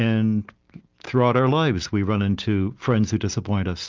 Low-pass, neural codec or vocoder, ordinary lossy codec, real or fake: 7.2 kHz; none; Opus, 24 kbps; real